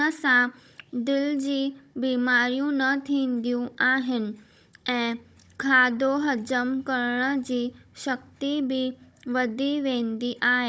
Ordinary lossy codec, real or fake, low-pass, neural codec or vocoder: none; fake; none; codec, 16 kHz, 16 kbps, FunCodec, trained on Chinese and English, 50 frames a second